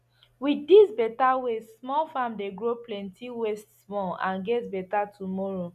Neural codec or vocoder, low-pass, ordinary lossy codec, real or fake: none; 14.4 kHz; none; real